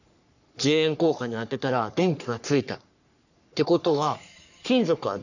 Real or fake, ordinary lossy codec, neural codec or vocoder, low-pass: fake; none; codec, 44.1 kHz, 3.4 kbps, Pupu-Codec; 7.2 kHz